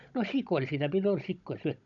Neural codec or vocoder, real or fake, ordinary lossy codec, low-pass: codec, 16 kHz, 16 kbps, FunCodec, trained on Chinese and English, 50 frames a second; fake; none; 7.2 kHz